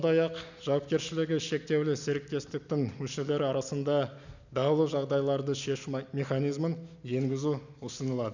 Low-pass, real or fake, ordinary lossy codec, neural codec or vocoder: 7.2 kHz; real; none; none